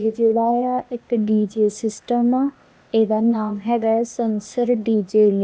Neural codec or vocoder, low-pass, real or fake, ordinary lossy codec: codec, 16 kHz, 0.8 kbps, ZipCodec; none; fake; none